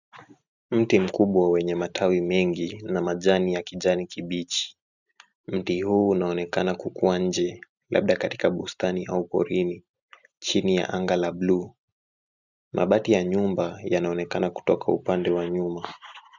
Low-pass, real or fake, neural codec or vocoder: 7.2 kHz; real; none